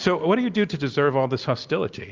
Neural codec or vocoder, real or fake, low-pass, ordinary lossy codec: none; real; 7.2 kHz; Opus, 24 kbps